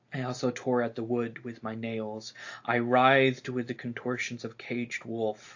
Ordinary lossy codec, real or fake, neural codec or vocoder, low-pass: AAC, 48 kbps; real; none; 7.2 kHz